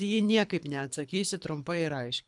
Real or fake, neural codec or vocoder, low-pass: fake; codec, 24 kHz, 3 kbps, HILCodec; 10.8 kHz